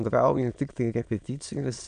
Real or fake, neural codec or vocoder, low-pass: fake; autoencoder, 22.05 kHz, a latent of 192 numbers a frame, VITS, trained on many speakers; 9.9 kHz